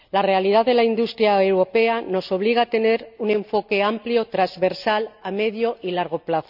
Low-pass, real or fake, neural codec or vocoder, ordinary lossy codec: 5.4 kHz; real; none; none